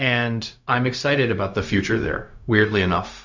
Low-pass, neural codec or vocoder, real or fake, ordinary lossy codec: 7.2 kHz; codec, 16 kHz, 0.4 kbps, LongCat-Audio-Codec; fake; MP3, 64 kbps